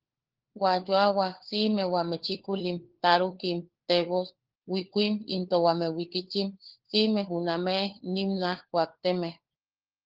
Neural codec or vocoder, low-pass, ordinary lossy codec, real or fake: codec, 16 kHz, 4 kbps, FunCodec, trained on LibriTTS, 50 frames a second; 5.4 kHz; Opus, 16 kbps; fake